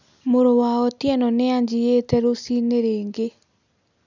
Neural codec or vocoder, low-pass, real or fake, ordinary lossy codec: none; 7.2 kHz; real; none